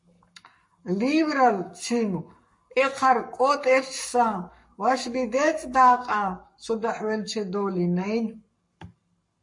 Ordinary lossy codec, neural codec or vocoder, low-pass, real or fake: MP3, 48 kbps; codec, 44.1 kHz, 7.8 kbps, Pupu-Codec; 10.8 kHz; fake